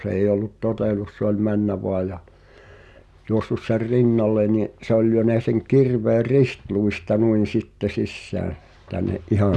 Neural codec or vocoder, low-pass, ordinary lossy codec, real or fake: none; none; none; real